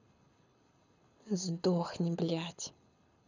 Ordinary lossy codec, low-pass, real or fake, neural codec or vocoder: none; 7.2 kHz; fake; codec, 24 kHz, 6 kbps, HILCodec